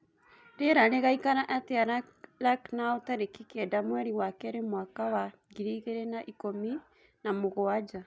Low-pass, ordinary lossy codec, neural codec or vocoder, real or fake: none; none; none; real